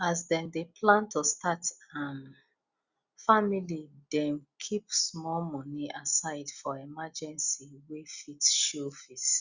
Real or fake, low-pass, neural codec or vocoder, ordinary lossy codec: real; 7.2 kHz; none; Opus, 64 kbps